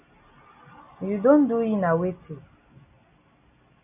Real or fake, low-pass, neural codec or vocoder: real; 3.6 kHz; none